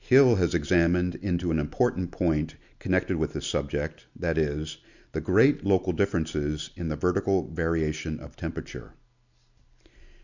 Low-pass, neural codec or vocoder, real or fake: 7.2 kHz; none; real